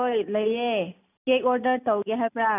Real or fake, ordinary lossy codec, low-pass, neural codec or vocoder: real; none; 3.6 kHz; none